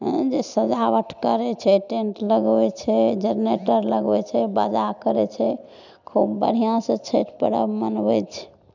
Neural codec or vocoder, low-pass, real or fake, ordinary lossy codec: none; 7.2 kHz; real; none